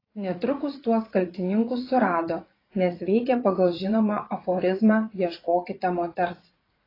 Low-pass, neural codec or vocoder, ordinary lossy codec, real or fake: 5.4 kHz; vocoder, 44.1 kHz, 80 mel bands, Vocos; AAC, 24 kbps; fake